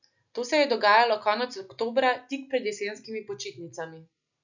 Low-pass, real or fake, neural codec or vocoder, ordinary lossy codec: 7.2 kHz; real; none; none